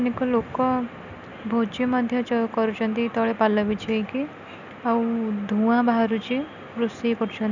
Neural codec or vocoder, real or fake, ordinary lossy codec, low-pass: none; real; none; 7.2 kHz